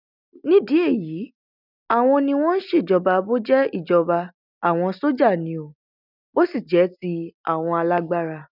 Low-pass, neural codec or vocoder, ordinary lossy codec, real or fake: 5.4 kHz; none; none; real